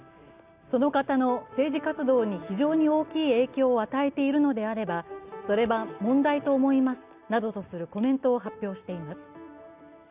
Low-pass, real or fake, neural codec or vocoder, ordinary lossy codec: 3.6 kHz; real; none; Opus, 64 kbps